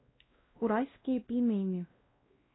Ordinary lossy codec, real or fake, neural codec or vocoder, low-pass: AAC, 16 kbps; fake; codec, 16 kHz, 1 kbps, X-Codec, WavLM features, trained on Multilingual LibriSpeech; 7.2 kHz